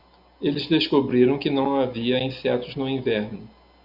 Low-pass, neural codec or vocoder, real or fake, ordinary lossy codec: 5.4 kHz; none; real; Opus, 64 kbps